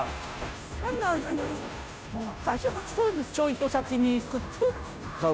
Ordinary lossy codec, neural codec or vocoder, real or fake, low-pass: none; codec, 16 kHz, 0.5 kbps, FunCodec, trained on Chinese and English, 25 frames a second; fake; none